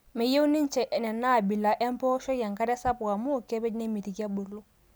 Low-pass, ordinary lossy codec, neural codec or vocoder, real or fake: none; none; none; real